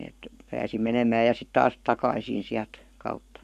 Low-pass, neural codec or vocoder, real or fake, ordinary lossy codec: 14.4 kHz; codec, 44.1 kHz, 7.8 kbps, Pupu-Codec; fake; none